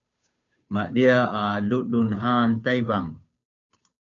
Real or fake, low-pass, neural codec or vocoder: fake; 7.2 kHz; codec, 16 kHz, 2 kbps, FunCodec, trained on Chinese and English, 25 frames a second